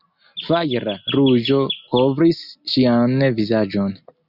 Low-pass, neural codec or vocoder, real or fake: 5.4 kHz; none; real